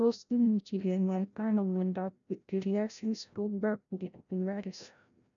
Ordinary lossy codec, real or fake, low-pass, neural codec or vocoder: none; fake; 7.2 kHz; codec, 16 kHz, 0.5 kbps, FreqCodec, larger model